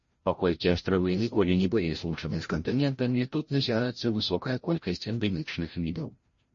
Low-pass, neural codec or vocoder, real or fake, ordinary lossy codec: 7.2 kHz; codec, 16 kHz, 0.5 kbps, FreqCodec, larger model; fake; MP3, 32 kbps